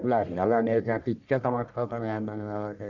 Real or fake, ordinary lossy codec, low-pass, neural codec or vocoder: fake; none; 7.2 kHz; codec, 44.1 kHz, 1.7 kbps, Pupu-Codec